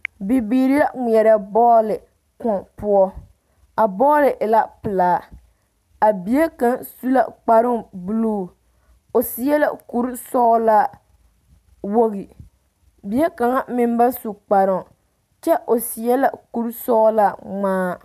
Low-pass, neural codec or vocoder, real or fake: 14.4 kHz; none; real